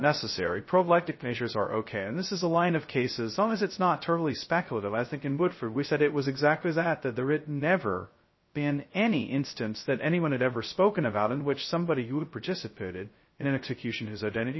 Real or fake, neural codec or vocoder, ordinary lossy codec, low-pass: fake; codec, 16 kHz, 0.2 kbps, FocalCodec; MP3, 24 kbps; 7.2 kHz